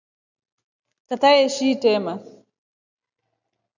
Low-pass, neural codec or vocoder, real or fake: 7.2 kHz; none; real